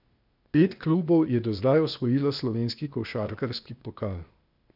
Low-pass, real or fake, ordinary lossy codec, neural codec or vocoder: 5.4 kHz; fake; none; codec, 16 kHz, 0.8 kbps, ZipCodec